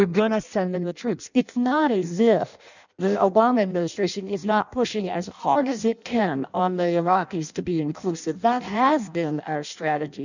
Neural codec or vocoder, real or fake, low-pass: codec, 16 kHz in and 24 kHz out, 0.6 kbps, FireRedTTS-2 codec; fake; 7.2 kHz